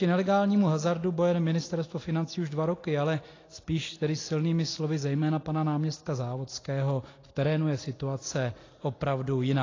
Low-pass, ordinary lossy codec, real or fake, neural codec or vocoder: 7.2 kHz; AAC, 32 kbps; real; none